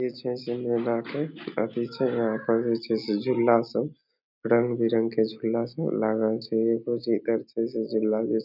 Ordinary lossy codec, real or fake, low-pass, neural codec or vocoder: none; real; 5.4 kHz; none